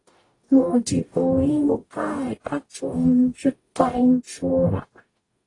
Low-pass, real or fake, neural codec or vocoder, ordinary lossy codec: 10.8 kHz; fake; codec, 44.1 kHz, 0.9 kbps, DAC; AAC, 32 kbps